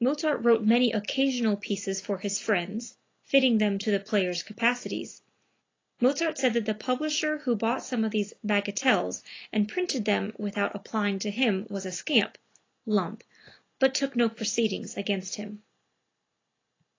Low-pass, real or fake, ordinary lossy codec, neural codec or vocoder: 7.2 kHz; real; AAC, 32 kbps; none